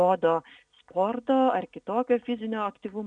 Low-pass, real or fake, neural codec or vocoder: 9.9 kHz; real; none